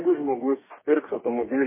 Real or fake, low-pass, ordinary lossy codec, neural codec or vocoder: fake; 3.6 kHz; MP3, 16 kbps; codec, 44.1 kHz, 2.6 kbps, DAC